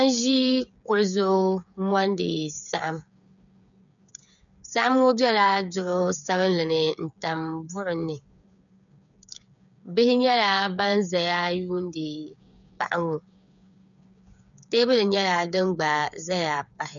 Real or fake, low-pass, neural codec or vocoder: fake; 7.2 kHz; codec, 16 kHz, 8 kbps, FreqCodec, smaller model